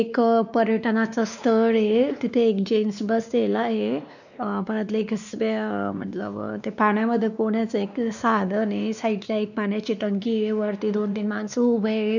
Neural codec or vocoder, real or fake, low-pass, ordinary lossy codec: codec, 16 kHz, 2 kbps, X-Codec, WavLM features, trained on Multilingual LibriSpeech; fake; 7.2 kHz; none